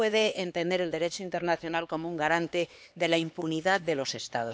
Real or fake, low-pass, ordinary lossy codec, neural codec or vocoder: fake; none; none; codec, 16 kHz, 2 kbps, X-Codec, HuBERT features, trained on LibriSpeech